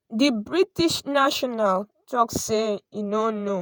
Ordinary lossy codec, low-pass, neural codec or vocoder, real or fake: none; none; vocoder, 48 kHz, 128 mel bands, Vocos; fake